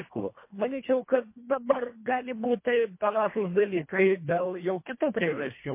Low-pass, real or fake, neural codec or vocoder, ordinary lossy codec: 3.6 kHz; fake; codec, 24 kHz, 1.5 kbps, HILCodec; MP3, 24 kbps